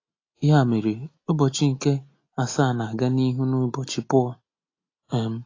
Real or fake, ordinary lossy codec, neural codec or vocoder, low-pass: real; AAC, 32 kbps; none; 7.2 kHz